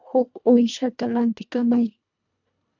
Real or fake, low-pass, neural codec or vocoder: fake; 7.2 kHz; codec, 24 kHz, 1.5 kbps, HILCodec